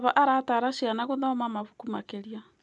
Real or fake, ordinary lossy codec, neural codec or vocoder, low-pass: real; none; none; 10.8 kHz